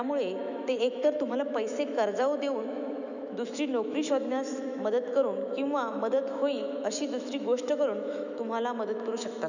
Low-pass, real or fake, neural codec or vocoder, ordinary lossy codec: 7.2 kHz; fake; autoencoder, 48 kHz, 128 numbers a frame, DAC-VAE, trained on Japanese speech; none